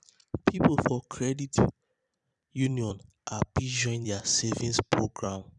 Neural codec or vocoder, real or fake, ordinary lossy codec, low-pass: vocoder, 44.1 kHz, 128 mel bands every 256 samples, BigVGAN v2; fake; none; 10.8 kHz